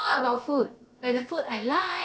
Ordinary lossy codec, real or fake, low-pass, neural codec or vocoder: none; fake; none; codec, 16 kHz, about 1 kbps, DyCAST, with the encoder's durations